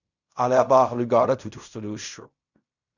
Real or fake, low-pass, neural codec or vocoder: fake; 7.2 kHz; codec, 16 kHz in and 24 kHz out, 0.4 kbps, LongCat-Audio-Codec, fine tuned four codebook decoder